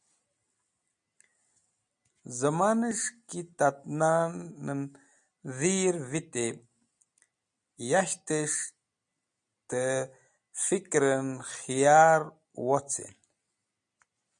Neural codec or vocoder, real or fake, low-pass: none; real; 9.9 kHz